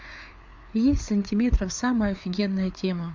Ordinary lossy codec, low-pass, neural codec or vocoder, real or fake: MP3, 64 kbps; 7.2 kHz; codec, 16 kHz, 4 kbps, FreqCodec, larger model; fake